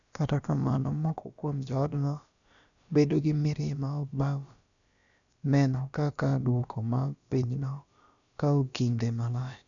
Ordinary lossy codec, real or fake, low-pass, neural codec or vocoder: none; fake; 7.2 kHz; codec, 16 kHz, about 1 kbps, DyCAST, with the encoder's durations